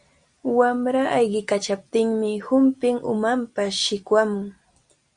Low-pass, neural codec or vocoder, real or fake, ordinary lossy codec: 9.9 kHz; none; real; Opus, 64 kbps